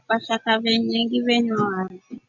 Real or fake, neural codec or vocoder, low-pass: real; none; 7.2 kHz